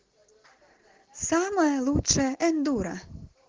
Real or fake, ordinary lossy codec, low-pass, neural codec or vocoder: real; Opus, 16 kbps; 7.2 kHz; none